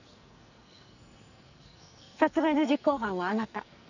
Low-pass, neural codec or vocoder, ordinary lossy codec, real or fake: 7.2 kHz; codec, 44.1 kHz, 2.6 kbps, SNAC; none; fake